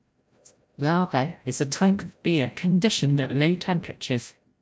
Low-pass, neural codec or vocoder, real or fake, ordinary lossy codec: none; codec, 16 kHz, 0.5 kbps, FreqCodec, larger model; fake; none